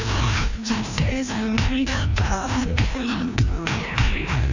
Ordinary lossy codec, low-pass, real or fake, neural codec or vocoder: none; 7.2 kHz; fake; codec, 16 kHz, 1 kbps, FreqCodec, larger model